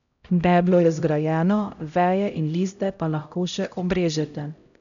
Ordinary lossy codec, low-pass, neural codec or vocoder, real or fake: none; 7.2 kHz; codec, 16 kHz, 0.5 kbps, X-Codec, HuBERT features, trained on LibriSpeech; fake